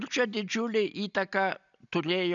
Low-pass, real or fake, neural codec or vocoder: 7.2 kHz; real; none